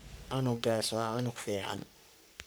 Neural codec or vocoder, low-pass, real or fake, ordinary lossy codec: codec, 44.1 kHz, 3.4 kbps, Pupu-Codec; none; fake; none